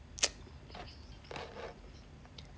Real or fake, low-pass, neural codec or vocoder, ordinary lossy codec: real; none; none; none